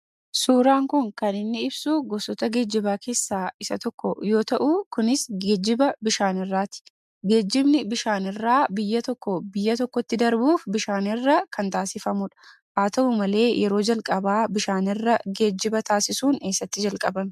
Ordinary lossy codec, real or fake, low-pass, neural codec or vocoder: MP3, 96 kbps; real; 14.4 kHz; none